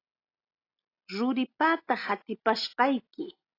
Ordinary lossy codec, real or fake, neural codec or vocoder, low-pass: AAC, 32 kbps; real; none; 5.4 kHz